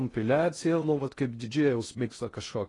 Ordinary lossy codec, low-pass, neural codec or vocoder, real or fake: AAC, 32 kbps; 10.8 kHz; codec, 16 kHz in and 24 kHz out, 0.6 kbps, FocalCodec, streaming, 4096 codes; fake